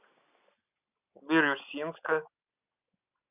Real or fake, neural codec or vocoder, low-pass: real; none; 3.6 kHz